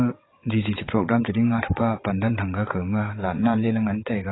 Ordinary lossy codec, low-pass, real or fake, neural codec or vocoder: AAC, 16 kbps; 7.2 kHz; fake; vocoder, 22.05 kHz, 80 mel bands, WaveNeXt